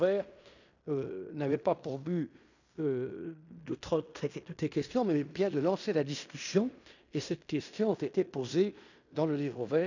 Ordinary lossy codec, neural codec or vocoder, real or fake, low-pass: none; codec, 16 kHz in and 24 kHz out, 0.9 kbps, LongCat-Audio-Codec, fine tuned four codebook decoder; fake; 7.2 kHz